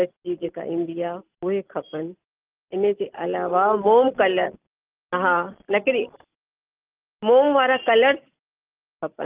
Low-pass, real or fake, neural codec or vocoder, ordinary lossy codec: 3.6 kHz; real; none; Opus, 32 kbps